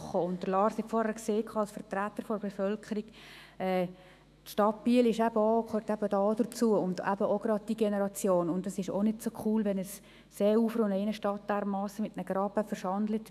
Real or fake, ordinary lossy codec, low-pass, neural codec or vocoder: fake; none; 14.4 kHz; autoencoder, 48 kHz, 128 numbers a frame, DAC-VAE, trained on Japanese speech